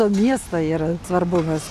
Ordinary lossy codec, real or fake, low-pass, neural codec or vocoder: MP3, 96 kbps; real; 14.4 kHz; none